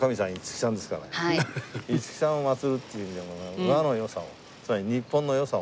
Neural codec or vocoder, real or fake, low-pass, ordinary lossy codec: none; real; none; none